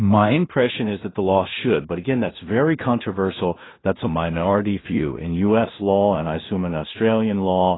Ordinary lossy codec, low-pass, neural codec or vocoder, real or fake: AAC, 16 kbps; 7.2 kHz; codec, 16 kHz in and 24 kHz out, 0.4 kbps, LongCat-Audio-Codec, two codebook decoder; fake